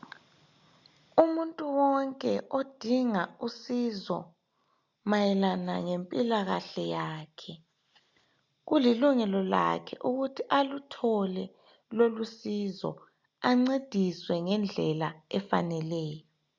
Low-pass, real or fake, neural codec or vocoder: 7.2 kHz; real; none